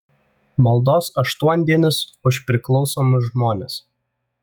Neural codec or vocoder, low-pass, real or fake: autoencoder, 48 kHz, 128 numbers a frame, DAC-VAE, trained on Japanese speech; 19.8 kHz; fake